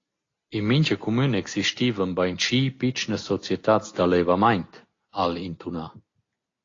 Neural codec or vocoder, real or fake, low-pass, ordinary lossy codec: none; real; 7.2 kHz; AAC, 32 kbps